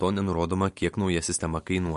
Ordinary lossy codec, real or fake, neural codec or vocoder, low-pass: MP3, 48 kbps; real; none; 14.4 kHz